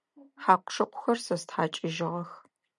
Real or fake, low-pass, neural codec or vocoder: real; 10.8 kHz; none